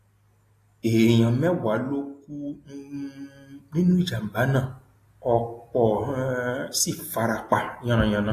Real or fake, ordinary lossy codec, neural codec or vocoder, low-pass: real; AAC, 48 kbps; none; 14.4 kHz